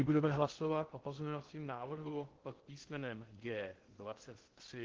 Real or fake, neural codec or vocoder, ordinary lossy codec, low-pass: fake; codec, 16 kHz in and 24 kHz out, 0.6 kbps, FocalCodec, streaming, 2048 codes; Opus, 16 kbps; 7.2 kHz